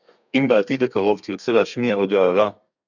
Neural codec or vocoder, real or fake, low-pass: codec, 32 kHz, 1.9 kbps, SNAC; fake; 7.2 kHz